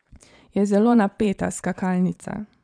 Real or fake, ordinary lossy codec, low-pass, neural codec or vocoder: fake; none; 9.9 kHz; vocoder, 22.05 kHz, 80 mel bands, Vocos